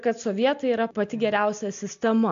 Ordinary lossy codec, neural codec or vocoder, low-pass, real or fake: AAC, 64 kbps; none; 7.2 kHz; real